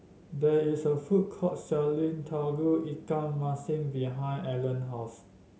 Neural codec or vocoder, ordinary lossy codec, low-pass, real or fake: none; none; none; real